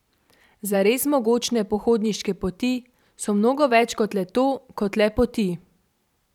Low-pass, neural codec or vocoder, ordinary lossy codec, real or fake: 19.8 kHz; vocoder, 44.1 kHz, 128 mel bands every 512 samples, BigVGAN v2; none; fake